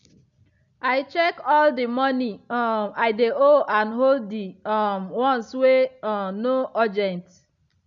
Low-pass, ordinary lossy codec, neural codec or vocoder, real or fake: 7.2 kHz; none; none; real